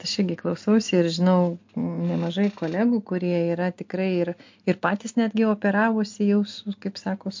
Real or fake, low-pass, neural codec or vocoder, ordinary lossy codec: real; 7.2 kHz; none; MP3, 48 kbps